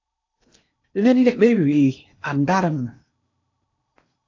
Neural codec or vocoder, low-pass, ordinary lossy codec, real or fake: codec, 16 kHz in and 24 kHz out, 0.8 kbps, FocalCodec, streaming, 65536 codes; 7.2 kHz; AAC, 48 kbps; fake